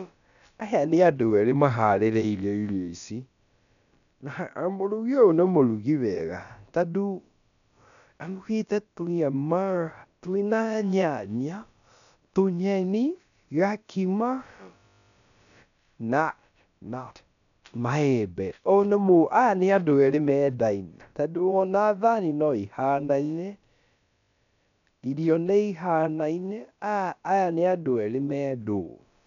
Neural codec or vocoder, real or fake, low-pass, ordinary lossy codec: codec, 16 kHz, about 1 kbps, DyCAST, with the encoder's durations; fake; 7.2 kHz; none